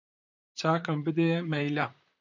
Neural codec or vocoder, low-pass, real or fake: vocoder, 44.1 kHz, 128 mel bands, Pupu-Vocoder; 7.2 kHz; fake